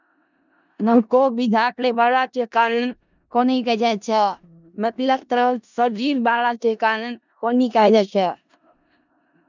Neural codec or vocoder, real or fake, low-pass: codec, 16 kHz in and 24 kHz out, 0.4 kbps, LongCat-Audio-Codec, four codebook decoder; fake; 7.2 kHz